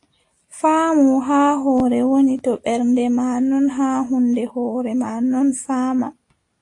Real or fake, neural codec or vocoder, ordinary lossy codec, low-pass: real; none; AAC, 48 kbps; 10.8 kHz